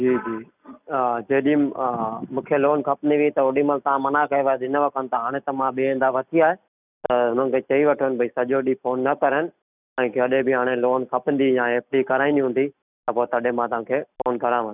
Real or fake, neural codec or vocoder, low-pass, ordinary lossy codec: real; none; 3.6 kHz; none